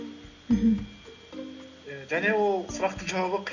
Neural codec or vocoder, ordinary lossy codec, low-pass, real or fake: none; none; 7.2 kHz; real